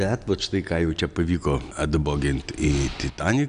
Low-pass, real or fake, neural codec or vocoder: 9.9 kHz; real; none